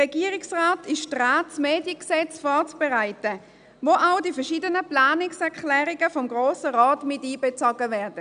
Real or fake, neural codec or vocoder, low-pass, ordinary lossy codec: real; none; 9.9 kHz; none